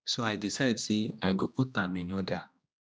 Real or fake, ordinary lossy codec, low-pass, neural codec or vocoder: fake; none; none; codec, 16 kHz, 1 kbps, X-Codec, HuBERT features, trained on general audio